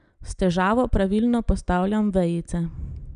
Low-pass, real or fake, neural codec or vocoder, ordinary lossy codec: 9.9 kHz; real; none; none